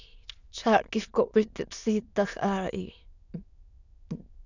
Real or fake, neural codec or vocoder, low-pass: fake; autoencoder, 22.05 kHz, a latent of 192 numbers a frame, VITS, trained on many speakers; 7.2 kHz